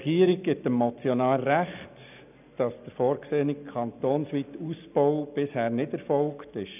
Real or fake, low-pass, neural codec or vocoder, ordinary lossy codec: real; 3.6 kHz; none; none